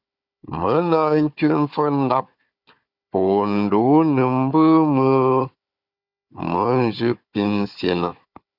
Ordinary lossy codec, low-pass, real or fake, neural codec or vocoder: Opus, 64 kbps; 5.4 kHz; fake; codec, 16 kHz, 4 kbps, FunCodec, trained on Chinese and English, 50 frames a second